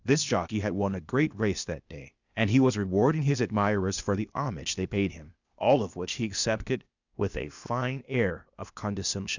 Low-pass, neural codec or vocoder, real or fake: 7.2 kHz; codec, 16 kHz, 0.8 kbps, ZipCodec; fake